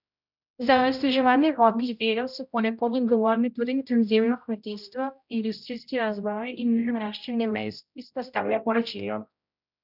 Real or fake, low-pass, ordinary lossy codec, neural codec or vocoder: fake; 5.4 kHz; none; codec, 16 kHz, 0.5 kbps, X-Codec, HuBERT features, trained on general audio